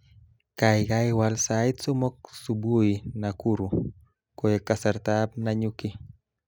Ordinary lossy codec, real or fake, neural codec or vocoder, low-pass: none; real; none; none